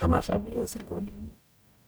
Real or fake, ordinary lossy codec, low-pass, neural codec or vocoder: fake; none; none; codec, 44.1 kHz, 0.9 kbps, DAC